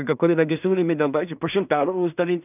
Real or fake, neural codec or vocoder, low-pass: fake; codec, 16 kHz in and 24 kHz out, 0.4 kbps, LongCat-Audio-Codec, two codebook decoder; 3.6 kHz